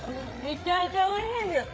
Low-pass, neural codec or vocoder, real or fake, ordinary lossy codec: none; codec, 16 kHz, 8 kbps, FreqCodec, larger model; fake; none